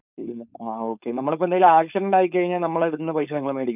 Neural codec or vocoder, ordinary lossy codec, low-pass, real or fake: codec, 16 kHz, 4.8 kbps, FACodec; none; 3.6 kHz; fake